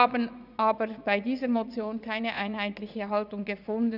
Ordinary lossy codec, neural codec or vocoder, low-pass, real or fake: none; vocoder, 44.1 kHz, 80 mel bands, Vocos; 5.4 kHz; fake